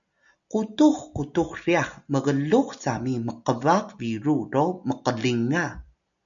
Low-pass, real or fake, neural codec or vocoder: 7.2 kHz; real; none